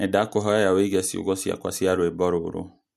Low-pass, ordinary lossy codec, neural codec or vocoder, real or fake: 14.4 kHz; MP3, 64 kbps; none; real